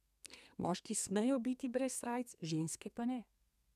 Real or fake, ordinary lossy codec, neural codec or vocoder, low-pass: fake; none; codec, 32 kHz, 1.9 kbps, SNAC; 14.4 kHz